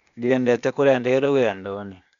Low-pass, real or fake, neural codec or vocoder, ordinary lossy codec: 7.2 kHz; fake; codec, 16 kHz, 0.8 kbps, ZipCodec; none